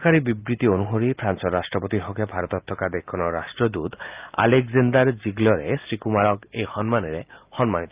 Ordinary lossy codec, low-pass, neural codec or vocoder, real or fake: Opus, 24 kbps; 3.6 kHz; none; real